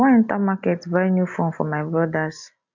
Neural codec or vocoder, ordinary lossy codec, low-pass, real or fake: none; none; 7.2 kHz; real